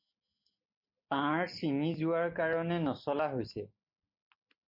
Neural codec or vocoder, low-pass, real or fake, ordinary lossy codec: none; 5.4 kHz; real; MP3, 32 kbps